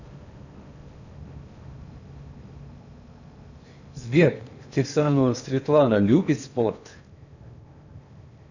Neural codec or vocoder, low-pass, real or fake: codec, 16 kHz in and 24 kHz out, 0.8 kbps, FocalCodec, streaming, 65536 codes; 7.2 kHz; fake